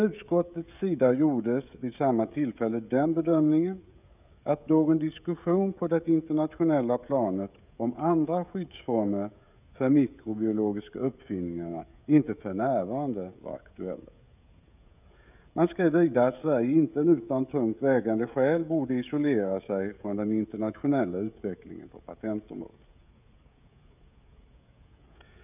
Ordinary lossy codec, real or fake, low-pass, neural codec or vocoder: none; fake; 3.6 kHz; codec, 16 kHz, 16 kbps, FreqCodec, smaller model